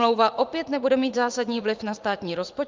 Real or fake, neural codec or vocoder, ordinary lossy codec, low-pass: fake; vocoder, 44.1 kHz, 80 mel bands, Vocos; Opus, 24 kbps; 7.2 kHz